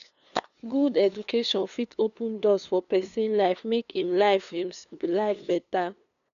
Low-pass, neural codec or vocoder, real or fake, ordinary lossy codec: 7.2 kHz; codec, 16 kHz, 2 kbps, FunCodec, trained on LibriTTS, 25 frames a second; fake; none